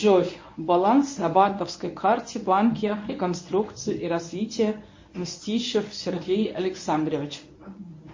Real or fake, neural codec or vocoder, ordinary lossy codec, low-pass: fake; codec, 24 kHz, 0.9 kbps, WavTokenizer, medium speech release version 1; MP3, 32 kbps; 7.2 kHz